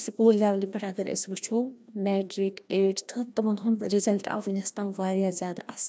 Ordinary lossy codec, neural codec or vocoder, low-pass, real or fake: none; codec, 16 kHz, 1 kbps, FreqCodec, larger model; none; fake